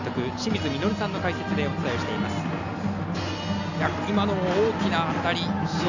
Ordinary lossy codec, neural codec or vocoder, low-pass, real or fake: none; none; 7.2 kHz; real